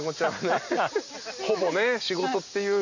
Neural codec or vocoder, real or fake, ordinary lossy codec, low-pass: none; real; none; 7.2 kHz